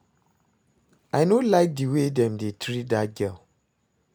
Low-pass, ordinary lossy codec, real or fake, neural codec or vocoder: none; none; real; none